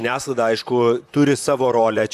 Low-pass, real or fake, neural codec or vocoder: 14.4 kHz; fake; vocoder, 44.1 kHz, 128 mel bands, Pupu-Vocoder